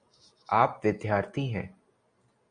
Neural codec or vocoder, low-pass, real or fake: none; 9.9 kHz; real